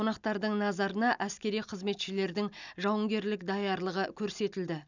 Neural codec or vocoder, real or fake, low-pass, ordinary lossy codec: none; real; 7.2 kHz; none